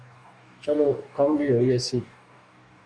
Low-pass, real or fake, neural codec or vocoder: 9.9 kHz; fake; codec, 44.1 kHz, 2.6 kbps, DAC